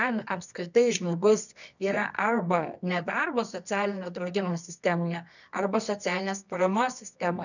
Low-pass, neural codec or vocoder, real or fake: 7.2 kHz; codec, 24 kHz, 0.9 kbps, WavTokenizer, medium music audio release; fake